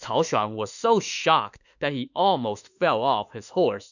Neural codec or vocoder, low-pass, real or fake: autoencoder, 48 kHz, 32 numbers a frame, DAC-VAE, trained on Japanese speech; 7.2 kHz; fake